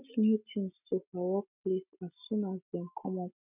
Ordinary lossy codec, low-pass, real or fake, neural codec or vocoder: none; 3.6 kHz; real; none